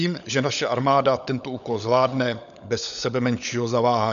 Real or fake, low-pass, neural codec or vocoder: fake; 7.2 kHz; codec, 16 kHz, 16 kbps, FunCodec, trained on LibriTTS, 50 frames a second